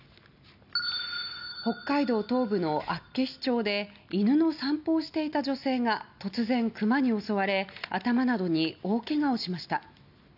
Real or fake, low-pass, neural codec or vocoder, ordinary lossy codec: real; 5.4 kHz; none; none